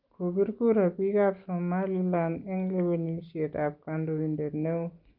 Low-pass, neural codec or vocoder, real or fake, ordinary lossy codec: 5.4 kHz; none; real; Opus, 24 kbps